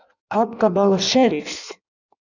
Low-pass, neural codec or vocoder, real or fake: 7.2 kHz; codec, 16 kHz in and 24 kHz out, 0.6 kbps, FireRedTTS-2 codec; fake